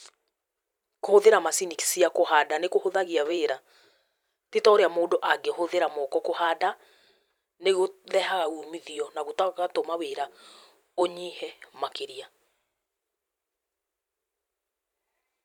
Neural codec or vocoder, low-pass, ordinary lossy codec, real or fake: vocoder, 44.1 kHz, 128 mel bands every 512 samples, BigVGAN v2; 19.8 kHz; none; fake